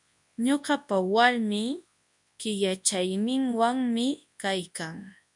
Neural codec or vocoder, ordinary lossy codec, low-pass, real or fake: codec, 24 kHz, 0.9 kbps, WavTokenizer, large speech release; MP3, 96 kbps; 10.8 kHz; fake